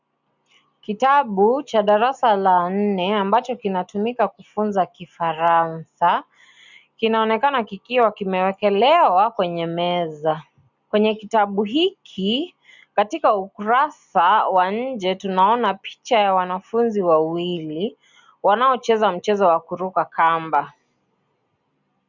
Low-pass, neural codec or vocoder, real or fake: 7.2 kHz; none; real